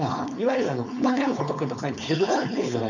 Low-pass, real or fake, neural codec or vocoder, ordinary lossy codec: 7.2 kHz; fake; codec, 16 kHz, 4.8 kbps, FACodec; none